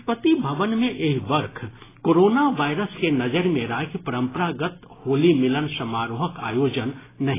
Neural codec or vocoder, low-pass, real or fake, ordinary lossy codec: none; 3.6 kHz; real; AAC, 16 kbps